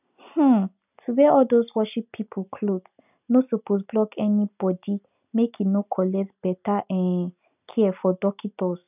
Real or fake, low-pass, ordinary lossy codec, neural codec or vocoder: real; 3.6 kHz; none; none